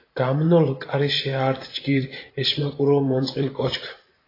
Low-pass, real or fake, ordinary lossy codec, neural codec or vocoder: 5.4 kHz; fake; AAC, 24 kbps; vocoder, 44.1 kHz, 128 mel bands every 512 samples, BigVGAN v2